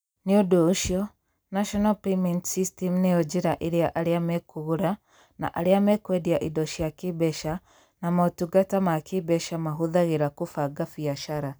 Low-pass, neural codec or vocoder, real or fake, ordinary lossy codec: none; none; real; none